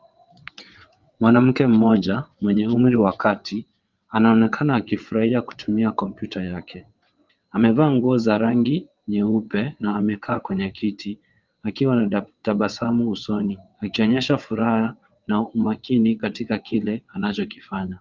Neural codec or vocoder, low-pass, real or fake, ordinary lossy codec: vocoder, 22.05 kHz, 80 mel bands, WaveNeXt; 7.2 kHz; fake; Opus, 32 kbps